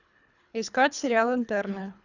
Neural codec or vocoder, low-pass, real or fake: codec, 24 kHz, 3 kbps, HILCodec; 7.2 kHz; fake